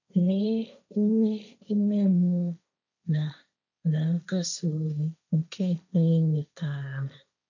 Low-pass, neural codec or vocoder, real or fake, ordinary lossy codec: 7.2 kHz; codec, 16 kHz, 1.1 kbps, Voila-Tokenizer; fake; none